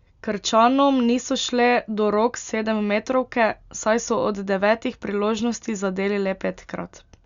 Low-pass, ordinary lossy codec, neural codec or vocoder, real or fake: 7.2 kHz; Opus, 64 kbps; none; real